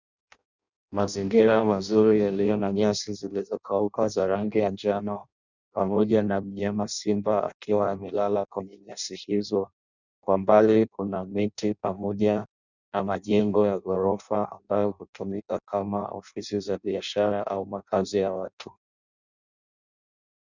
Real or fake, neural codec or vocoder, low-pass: fake; codec, 16 kHz in and 24 kHz out, 0.6 kbps, FireRedTTS-2 codec; 7.2 kHz